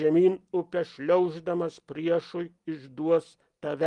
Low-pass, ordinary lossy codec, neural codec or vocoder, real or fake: 10.8 kHz; Opus, 24 kbps; none; real